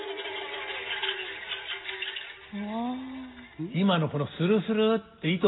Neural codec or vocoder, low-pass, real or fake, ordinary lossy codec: none; 7.2 kHz; real; AAC, 16 kbps